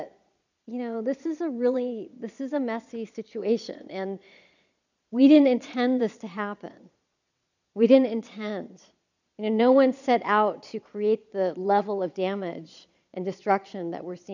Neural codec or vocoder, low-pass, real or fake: vocoder, 22.05 kHz, 80 mel bands, Vocos; 7.2 kHz; fake